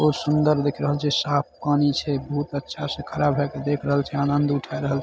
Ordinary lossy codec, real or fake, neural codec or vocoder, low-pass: none; real; none; none